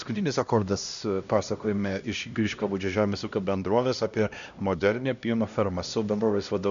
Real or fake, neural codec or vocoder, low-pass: fake; codec, 16 kHz, 1 kbps, X-Codec, HuBERT features, trained on LibriSpeech; 7.2 kHz